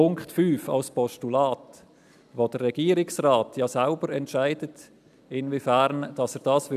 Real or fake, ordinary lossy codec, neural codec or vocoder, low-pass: real; none; none; 14.4 kHz